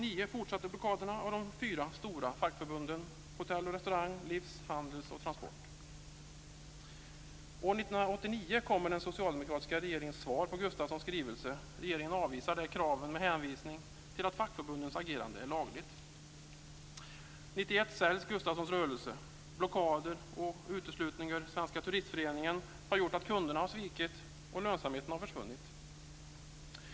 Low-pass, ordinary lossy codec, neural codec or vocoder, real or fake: none; none; none; real